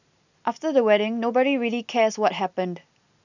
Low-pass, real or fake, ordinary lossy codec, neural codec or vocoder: 7.2 kHz; real; none; none